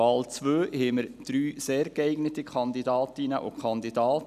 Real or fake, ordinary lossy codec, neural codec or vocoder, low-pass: real; none; none; 14.4 kHz